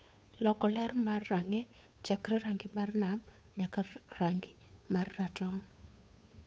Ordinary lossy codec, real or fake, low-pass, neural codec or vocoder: none; fake; none; codec, 16 kHz, 2 kbps, FunCodec, trained on Chinese and English, 25 frames a second